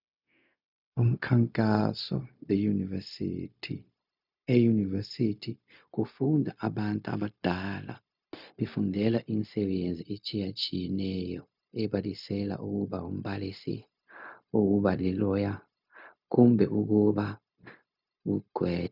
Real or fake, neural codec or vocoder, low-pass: fake; codec, 16 kHz, 0.4 kbps, LongCat-Audio-Codec; 5.4 kHz